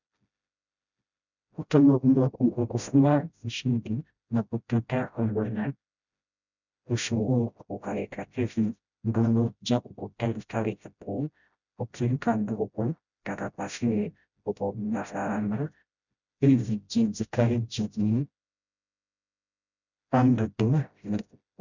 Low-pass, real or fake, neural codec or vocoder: 7.2 kHz; fake; codec, 16 kHz, 0.5 kbps, FreqCodec, smaller model